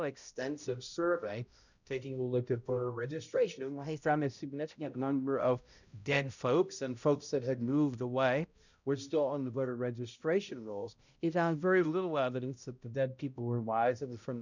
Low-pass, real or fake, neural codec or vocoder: 7.2 kHz; fake; codec, 16 kHz, 0.5 kbps, X-Codec, HuBERT features, trained on balanced general audio